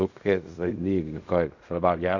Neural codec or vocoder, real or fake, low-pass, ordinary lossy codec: codec, 16 kHz in and 24 kHz out, 0.4 kbps, LongCat-Audio-Codec, fine tuned four codebook decoder; fake; 7.2 kHz; none